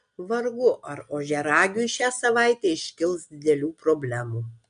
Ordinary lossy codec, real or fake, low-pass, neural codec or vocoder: MP3, 64 kbps; real; 9.9 kHz; none